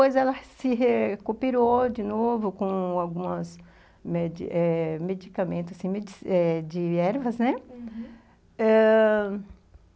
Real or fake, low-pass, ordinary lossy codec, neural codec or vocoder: real; none; none; none